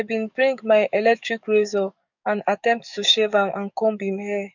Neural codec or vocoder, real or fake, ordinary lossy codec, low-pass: codec, 44.1 kHz, 7.8 kbps, DAC; fake; none; 7.2 kHz